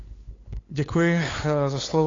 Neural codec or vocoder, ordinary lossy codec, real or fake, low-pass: codec, 16 kHz, 8 kbps, FunCodec, trained on LibriTTS, 25 frames a second; AAC, 32 kbps; fake; 7.2 kHz